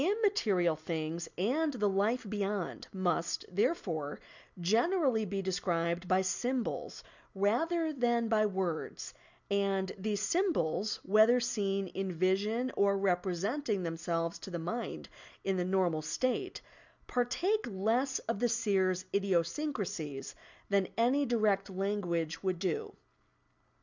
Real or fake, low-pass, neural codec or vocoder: real; 7.2 kHz; none